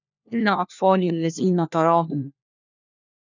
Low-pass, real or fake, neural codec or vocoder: 7.2 kHz; fake; codec, 16 kHz, 1 kbps, FunCodec, trained on LibriTTS, 50 frames a second